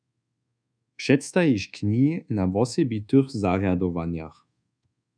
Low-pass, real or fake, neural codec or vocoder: 9.9 kHz; fake; codec, 24 kHz, 1.2 kbps, DualCodec